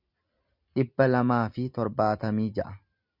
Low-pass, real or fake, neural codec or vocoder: 5.4 kHz; real; none